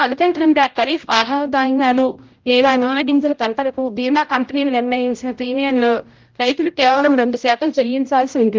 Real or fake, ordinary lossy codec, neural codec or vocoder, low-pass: fake; Opus, 24 kbps; codec, 16 kHz, 0.5 kbps, X-Codec, HuBERT features, trained on general audio; 7.2 kHz